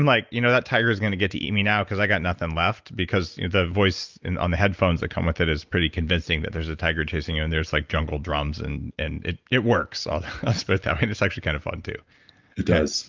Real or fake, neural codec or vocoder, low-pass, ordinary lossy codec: real; none; 7.2 kHz; Opus, 24 kbps